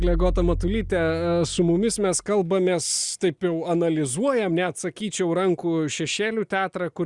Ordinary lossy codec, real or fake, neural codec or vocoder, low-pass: Opus, 64 kbps; real; none; 10.8 kHz